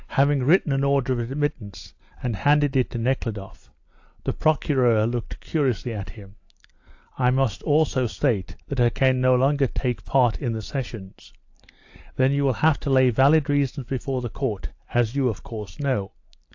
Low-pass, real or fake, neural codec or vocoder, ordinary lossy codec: 7.2 kHz; real; none; AAC, 48 kbps